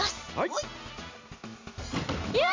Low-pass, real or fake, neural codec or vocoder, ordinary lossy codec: 7.2 kHz; real; none; MP3, 48 kbps